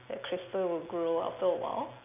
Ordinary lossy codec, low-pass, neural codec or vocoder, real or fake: none; 3.6 kHz; none; real